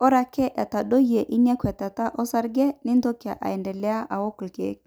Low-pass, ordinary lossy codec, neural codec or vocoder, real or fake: none; none; none; real